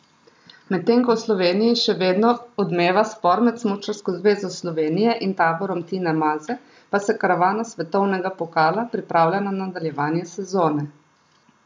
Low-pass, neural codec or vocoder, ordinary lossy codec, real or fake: none; none; none; real